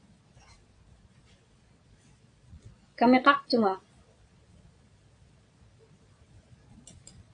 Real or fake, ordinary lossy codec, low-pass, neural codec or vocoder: fake; AAC, 48 kbps; 9.9 kHz; vocoder, 22.05 kHz, 80 mel bands, Vocos